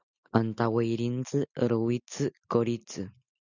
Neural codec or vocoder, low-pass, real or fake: none; 7.2 kHz; real